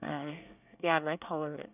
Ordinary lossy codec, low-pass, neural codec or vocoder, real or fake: none; 3.6 kHz; codec, 24 kHz, 1 kbps, SNAC; fake